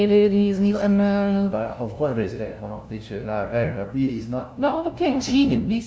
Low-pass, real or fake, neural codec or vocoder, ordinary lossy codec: none; fake; codec, 16 kHz, 0.5 kbps, FunCodec, trained on LibriTTS, 25 frames a second; none